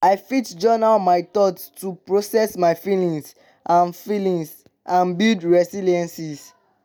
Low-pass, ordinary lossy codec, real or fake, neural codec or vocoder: none; none; real; none